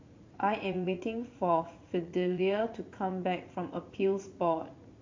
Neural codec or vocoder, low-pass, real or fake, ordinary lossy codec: vocoder, 44.1 kHz, 80 mel bands, Vocos; 7.2 kHz; fake; MP3, 48 kbps